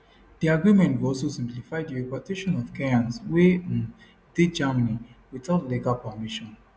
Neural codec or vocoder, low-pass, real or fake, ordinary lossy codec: none; none; real; none